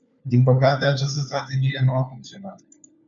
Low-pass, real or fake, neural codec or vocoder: 7.2 kHz; fake; codec, 16 kHz, 4 kbps, FunCodec, trained on LibriTTS, 50 frames a second